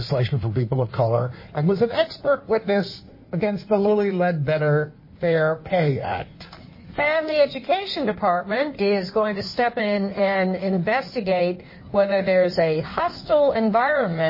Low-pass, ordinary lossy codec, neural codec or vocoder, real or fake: 5.4 kHz; MP3, 24 kbps; codec, 16 kHz in and 24 kHz out, 1.1 kbps, FireRedTTS-2 codec; fake